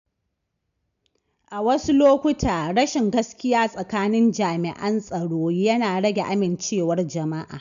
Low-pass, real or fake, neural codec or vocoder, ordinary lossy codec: 7.2 kHz; real; none; none